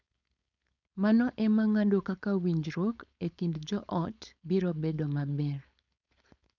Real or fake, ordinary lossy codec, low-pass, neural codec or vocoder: fake; none; 7.2 kHz; codec, 16 kHz, 4.8 kbps, FACodec